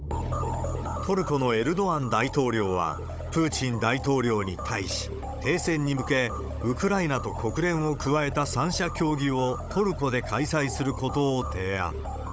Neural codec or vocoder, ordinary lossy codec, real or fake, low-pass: codec, 16 kHz, 16 kbps, FunCodec, trained on Chinese and English, 50 frames a second; none; fake; none